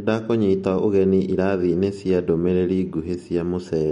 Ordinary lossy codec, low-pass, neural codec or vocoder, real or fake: MP3, 48 kbps; 19.8 kHz; none; real